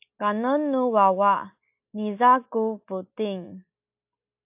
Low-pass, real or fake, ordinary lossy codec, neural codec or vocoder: 3.6 kHz; real; AAC, 32 kbps; none